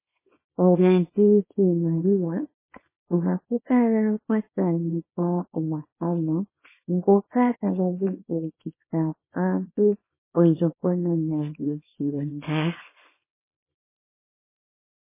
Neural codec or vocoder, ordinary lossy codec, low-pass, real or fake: codec, 24 kHz, 0.9 kbps, WavTokenizer, small release; MP3, 16 kbps; 3.6 kHz; fake